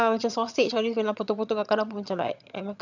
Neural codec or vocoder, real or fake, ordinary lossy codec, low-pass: vocoder, 22.05 kHz, 80 mel bands, HiFi-GAN; fake; none; 7.2 kHz